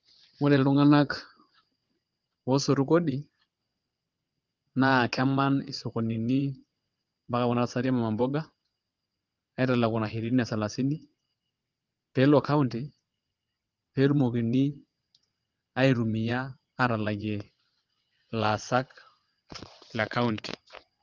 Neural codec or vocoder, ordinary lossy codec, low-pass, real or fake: vocoder, 22.05 kHz, 80 mel bands, WaveNeXt; Opus, 32 kbps; 7.2 kHz; fake